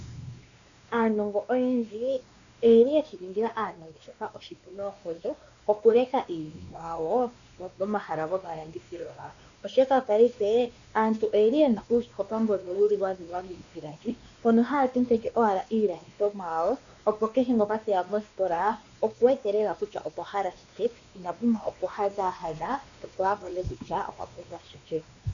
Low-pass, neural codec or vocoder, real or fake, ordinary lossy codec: 7.2 kHz; codec, 16 kHz, 2 kbps, X-Codec, WavLM features, trained on Multilingual LibriSpeech; fake; MP3, 96 kbps